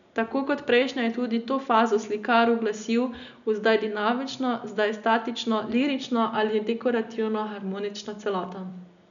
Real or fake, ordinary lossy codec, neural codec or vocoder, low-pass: real; none; none; 7.2 kHz